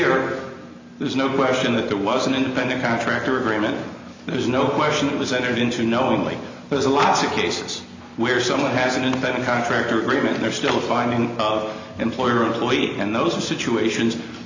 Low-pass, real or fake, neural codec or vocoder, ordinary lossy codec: 7.2 kHz; real; none; MP3, 64 kbps